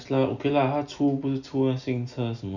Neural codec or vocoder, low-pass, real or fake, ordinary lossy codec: none; 7.2 kHz; real; none